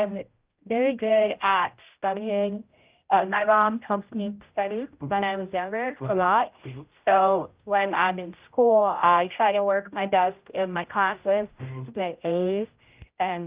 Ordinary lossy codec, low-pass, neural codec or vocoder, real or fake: Opus, 32 kbps; 3.6 kHz; codec, 16 kHz, 0.5 kbps, X-Codec, HuBERT features, trained on general audio; fake